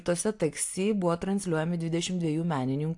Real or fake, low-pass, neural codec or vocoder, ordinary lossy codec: real; 10.8 kHz; none; AAC, 64 kbps